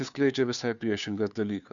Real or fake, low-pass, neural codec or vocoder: fake; 7.2 kHz; codec, 16 kHz, 2 kbps, FunCodec, trained on Chinese and English, 25 frames a second